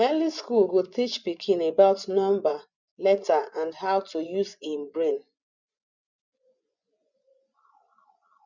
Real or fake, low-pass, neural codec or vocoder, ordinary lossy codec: fake; 7.2 kHz; vocoder, 24 kHz, 100 mel bands, Vocos; none